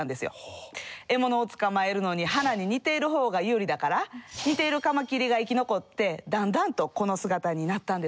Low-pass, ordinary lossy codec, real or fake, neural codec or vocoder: none; none; real; none